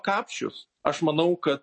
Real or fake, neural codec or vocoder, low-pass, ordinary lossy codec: real; none; 9.9 kHz; MP3, 32 kbps